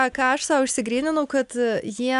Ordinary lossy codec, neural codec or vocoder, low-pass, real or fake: MP3, 96 kbps; none; 10.8 kHz; real